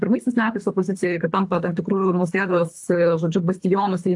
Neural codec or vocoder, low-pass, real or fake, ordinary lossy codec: codec, 24 kHz, 3 kbps, HILCodec; 10.8 kHz; fake; AAC, 64 kbps